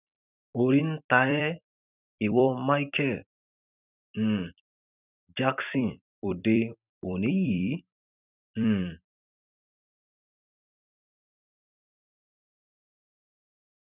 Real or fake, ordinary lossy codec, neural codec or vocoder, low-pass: fake; none; vocoder, 44.1 kHz, 128 mel bands every 256 samples, BigVGAN v2; 3.6 kHz